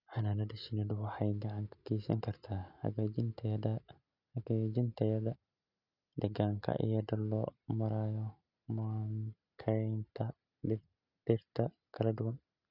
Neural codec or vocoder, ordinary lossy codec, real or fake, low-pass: none; none; real; 5.4 kHz